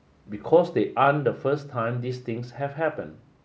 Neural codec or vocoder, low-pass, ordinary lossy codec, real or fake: none; none; none; real